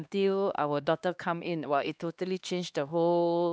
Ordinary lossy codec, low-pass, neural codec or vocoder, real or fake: none; none; codec, 16 kHz, 2 kbps, X-Codec, HuBERT features, trained on LibriSpeech; fake